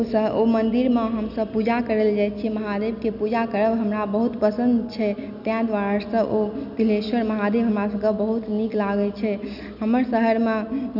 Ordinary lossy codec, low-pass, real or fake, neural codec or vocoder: none; 5.4 kHz; real; none